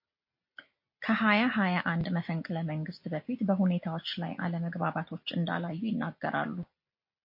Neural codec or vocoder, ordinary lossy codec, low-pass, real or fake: none; MP3, 32 kbps; 5.4 kHz; real